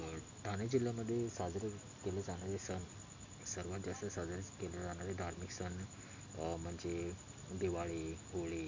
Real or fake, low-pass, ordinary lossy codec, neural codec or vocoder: real; 7.2 kHz; MP3, 48 kbps; none